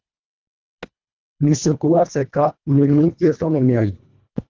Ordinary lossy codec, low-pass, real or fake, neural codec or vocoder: Opus, 32 kbps; 7.2 kHz; fake; codec, 24 kHz, 1.5 kbps, HILCodec